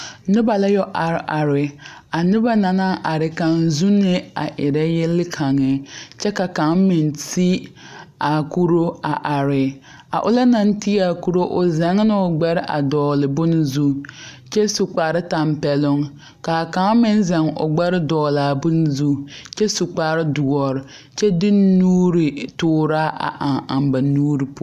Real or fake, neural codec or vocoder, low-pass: real; none; 14.4 kHz